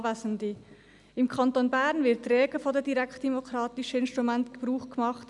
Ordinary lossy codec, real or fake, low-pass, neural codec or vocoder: none; real; 10.8 kHz; none